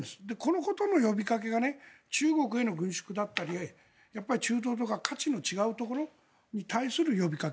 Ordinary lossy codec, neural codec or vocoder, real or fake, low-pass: none; none; real; none